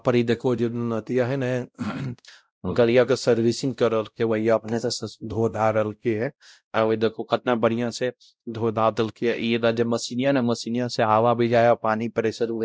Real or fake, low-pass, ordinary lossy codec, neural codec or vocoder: fake; none; none; codec, 16 kHz, 0.5 kbps, X-Codec, WavLM features, trained on Multilingual LibriSpeech